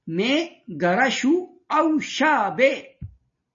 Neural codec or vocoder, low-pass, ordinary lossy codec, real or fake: none; 7.2 kHz; MP3, 32 kbps; real